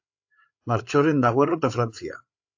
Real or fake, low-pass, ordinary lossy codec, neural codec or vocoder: fake; 7.2 kHz; AAC, 48 kbps; codec, 16 kHz, 8 kbps, FreqCodec, larger model